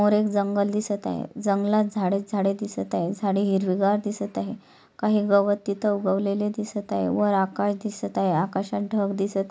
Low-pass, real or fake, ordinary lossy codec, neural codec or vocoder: none; real; none; none